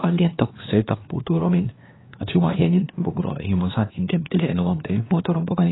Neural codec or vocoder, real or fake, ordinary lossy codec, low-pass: codec, 16 kHz, 2 kbps, X-Codec, WavLM features, trained on Multilingual LibriSpeech; fake; AAC, 16 kbps; 7.2 kHz